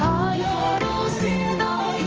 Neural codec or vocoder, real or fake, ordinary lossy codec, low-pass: codec, 16 kHz, 1 kbps, X-Codec, HuBERT features, trained on general audio; fake; Opus, 24 kbps; 7.2 kHz